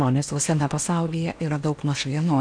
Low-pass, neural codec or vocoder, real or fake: 9.9 kHz; codec, 16 kHz in and 24 kHz out, 0.8 kbps, FocalCodec, streaming, 65536 codes; fake